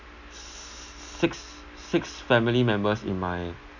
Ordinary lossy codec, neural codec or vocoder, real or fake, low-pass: none; none; real; 7.2 kHz